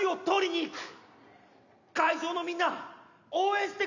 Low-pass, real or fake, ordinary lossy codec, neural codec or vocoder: 7.2 kHz; real; none; none